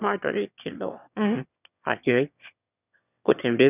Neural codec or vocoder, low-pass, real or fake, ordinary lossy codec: autoencoder, 22.05 kHz, a latent of 192 numbers a frame, VITS, trained on one speaker; 3.6 kHz; fake; none